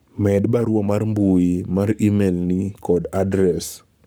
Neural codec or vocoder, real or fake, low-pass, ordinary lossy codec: codec, 44.1 kHz, 7.8 kbps, Pupu-Codec; fake; none; none